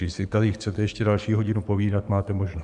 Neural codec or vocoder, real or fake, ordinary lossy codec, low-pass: codec, 44.1 kHz, 7.8 kbps, DAC; fake; Opus, 64 kbps; 10.8 kHz